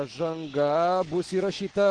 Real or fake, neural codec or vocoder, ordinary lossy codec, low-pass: fake; autoencoder, 48 kHz, 128 numbers a frame, DAC-VAE, trained on Japanese speech; Opus, 16 kbps; 9.9 kHz